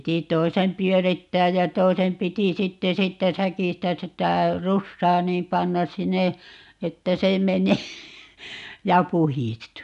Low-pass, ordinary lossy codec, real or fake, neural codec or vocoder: 10.8 kHz; none; real; none